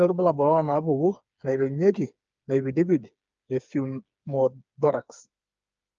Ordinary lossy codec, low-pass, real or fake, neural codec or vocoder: Opus, 24 kbps; 7.2 kHz; fake; codec, 16 kHz, 4 kbps, FreqCodec, smaller model